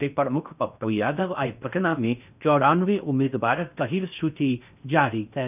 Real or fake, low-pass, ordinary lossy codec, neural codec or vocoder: fake; 3.6 kHz; none; codec, 16 kHz in and 24 kHz out, 0.6 kbps, FocalCodec, streaming, 4096 codes